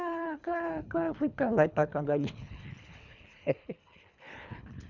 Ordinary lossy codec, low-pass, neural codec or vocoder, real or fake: none; 7.2 kHz; codec, 24 kHz, 3 kbps, HILCodec; fake